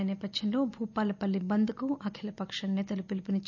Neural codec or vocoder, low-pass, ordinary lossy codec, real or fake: none; 7.2 kHz; none; real